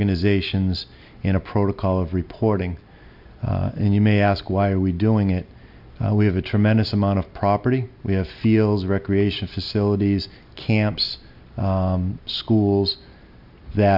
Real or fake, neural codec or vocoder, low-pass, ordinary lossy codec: real; none; 5.4 kHz; MP3, 48 kbps